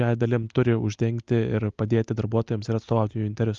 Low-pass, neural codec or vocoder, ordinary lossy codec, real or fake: 7.2 kHz; none; Opus, 32 kbps; real